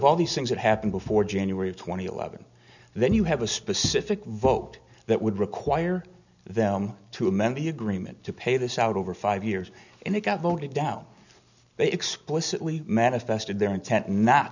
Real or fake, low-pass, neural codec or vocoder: real; 7.2 kHz; none